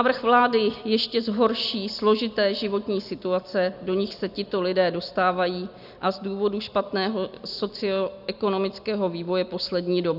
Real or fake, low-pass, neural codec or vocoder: real; 5.4 kHz; none